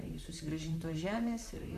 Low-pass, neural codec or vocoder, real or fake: 14.4 kHz; vocoder, 44.1 kHz, 128 mel bands, Pupu-Vocoder; fake